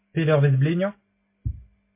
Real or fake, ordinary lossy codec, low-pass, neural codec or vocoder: real; MP3, 24 kbps; 3.6 kHz; none